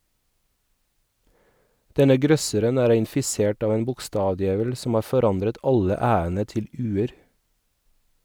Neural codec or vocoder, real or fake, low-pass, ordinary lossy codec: none; real; none; none